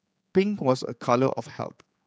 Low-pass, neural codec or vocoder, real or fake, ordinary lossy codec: none; codec, 16 kHz, 4 kbps, X-Codec, HuBERT features, trained on general audio; fake; none